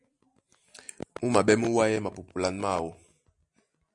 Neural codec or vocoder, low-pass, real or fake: none; 10.8 kHz; real